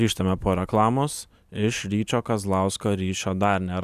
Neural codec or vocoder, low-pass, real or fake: none; 14.4 kHz; real